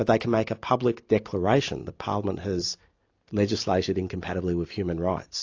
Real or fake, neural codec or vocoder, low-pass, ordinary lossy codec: real; none; 7.2 kHz; AAC, 48 kbps